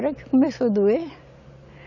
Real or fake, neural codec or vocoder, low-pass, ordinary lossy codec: real; none; 7.2 kHz; none